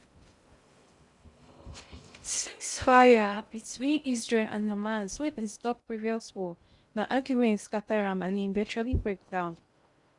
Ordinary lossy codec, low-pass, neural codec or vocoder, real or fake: Opus, 64 kbps; 10.8 kHz; codec, 16 kHz in and 24 kHz out, 0.6 kbps, FocalCodec, streaming, 2048 codes; fake